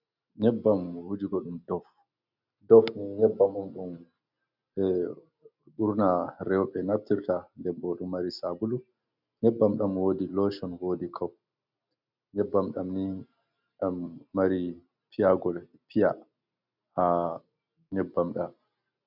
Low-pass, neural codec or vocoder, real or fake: 5.4 kHz; none; real